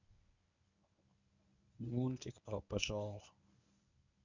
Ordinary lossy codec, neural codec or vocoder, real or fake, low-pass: none; codec, 24 kHz, 0.9 kbps, WavTokenizer, medium speech release version 1; fake; 7.2 kHz